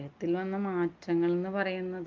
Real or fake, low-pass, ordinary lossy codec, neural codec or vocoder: real; 7.2 kHz; Opus, 24 kbps; none